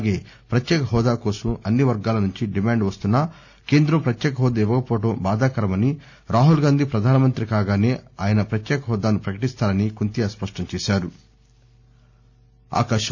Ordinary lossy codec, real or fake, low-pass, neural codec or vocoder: none; real; 7.2 kHz; none